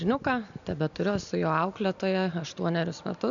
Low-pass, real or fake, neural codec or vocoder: 7.2 kHz; real; none